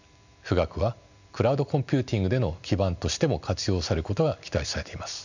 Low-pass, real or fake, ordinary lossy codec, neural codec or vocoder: 7.2 kHz; real; none; none